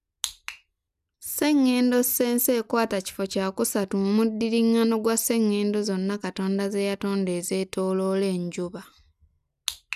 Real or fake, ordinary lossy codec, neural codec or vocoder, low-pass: real; none; none; 14.4 kHz